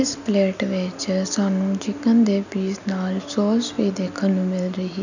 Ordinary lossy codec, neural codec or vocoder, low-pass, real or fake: none; none; 7.2 kHz; real